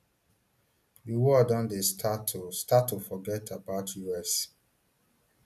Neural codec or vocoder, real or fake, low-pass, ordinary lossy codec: none; real; 14.4 kHz; none